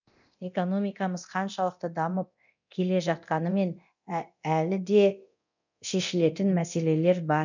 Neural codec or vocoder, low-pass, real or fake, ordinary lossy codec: codec, 24 kHz, 0.9 kbps, DualCodec; 7.2 kHz; fake; none